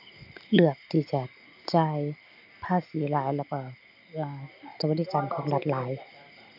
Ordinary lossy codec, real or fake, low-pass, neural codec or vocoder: none; real; 5.4 kHz; none